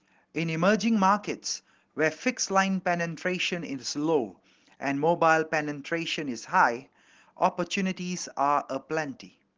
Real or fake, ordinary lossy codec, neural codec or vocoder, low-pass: real; Opus, 16 kbps; none; 7.2 kHz